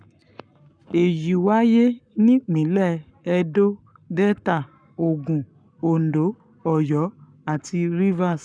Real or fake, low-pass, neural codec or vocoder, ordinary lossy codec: fake; 9.9 kHz; codec, 44.1 kHz, 7.8 kbps, Pupu-Codec; none